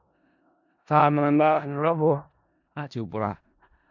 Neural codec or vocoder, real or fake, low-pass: codec, 16 kHz in and 24 kHz out, 0.4 kbps, LongCat-Audio-Codec, four codebook decoder; fake; 7.2 kHz